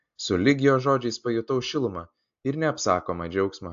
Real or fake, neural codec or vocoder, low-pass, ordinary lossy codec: real; none; 7.2 kHz; AAC, 64 kbps